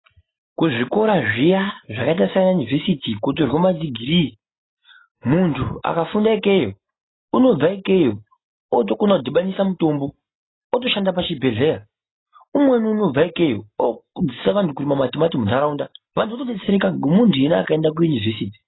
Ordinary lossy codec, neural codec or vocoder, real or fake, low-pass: AAC, 16 kbps; none; real; 7.2 kHz